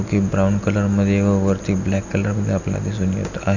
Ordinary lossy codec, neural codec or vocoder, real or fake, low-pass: none; none; real; 7.2 kHz